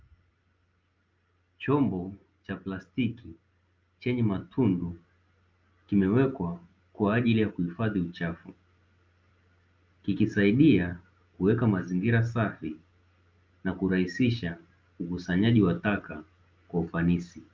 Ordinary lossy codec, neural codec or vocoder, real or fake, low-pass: Opus, 24 kbps; none; real; 7.2 kHz